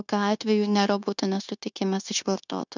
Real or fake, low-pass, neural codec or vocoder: fake; 7.2 kHz; codec, 16 kHz, 0.9 kbps, LongCat-Audio-Codec